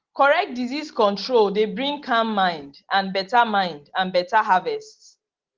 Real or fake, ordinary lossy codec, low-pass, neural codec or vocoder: real; Opus, 16 kbps; 7.2 kHz; none